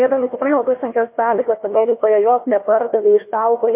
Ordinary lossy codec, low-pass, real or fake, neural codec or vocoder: MP3, 24 kbps; 3.6 kHz; fake; codec, 16 kHz, 1 kbps, FunCodec, trained on Chinese and English, 50 frames a second